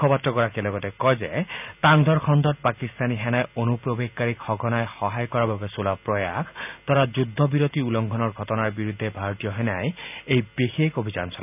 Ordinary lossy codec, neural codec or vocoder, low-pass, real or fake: none; none; 3.6 kHz; real